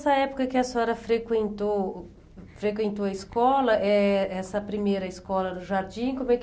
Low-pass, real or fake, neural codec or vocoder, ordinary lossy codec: none; real; none; none